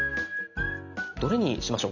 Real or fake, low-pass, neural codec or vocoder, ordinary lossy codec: real; 7.2 kHz; none; none